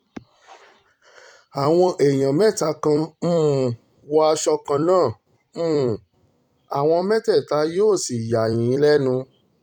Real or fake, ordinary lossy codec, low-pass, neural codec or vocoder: fake; none; 19.8 kHz; vocoder, 44.1 kHz, 128 mel bands every 256 samples, BigVGAN v2